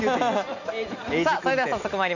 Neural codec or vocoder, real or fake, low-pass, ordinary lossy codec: none; real; 7.2 kHz; none